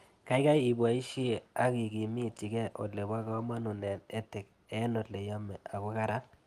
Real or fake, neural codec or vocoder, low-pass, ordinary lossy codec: real; none; 14.4 kHz; Opus, 32 kbps